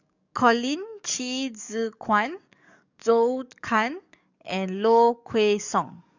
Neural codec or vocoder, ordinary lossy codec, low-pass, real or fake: codec, 44.1 kHz, 7.8 kbps, DAC; none; 7.2 kHz; fake